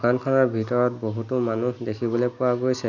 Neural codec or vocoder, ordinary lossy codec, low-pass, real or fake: none; none; 7.2 kHz; real